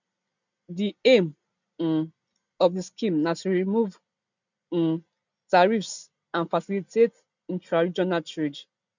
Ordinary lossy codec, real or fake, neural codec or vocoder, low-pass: none; real; none; 7.2 kHz